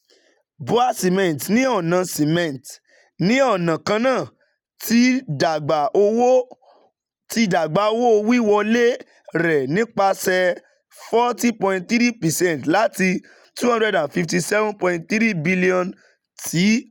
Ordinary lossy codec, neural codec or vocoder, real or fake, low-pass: none; none; real; 19.8 kHz